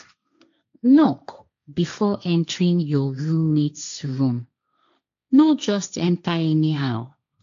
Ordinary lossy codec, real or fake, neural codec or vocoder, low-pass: AAC, 64 kbps; fake; codec, 16 kHz, 1.1 kbps, Voila-Tokenizer; 7.2 kHz